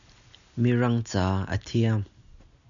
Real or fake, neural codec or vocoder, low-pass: real; none; 7.2 kHz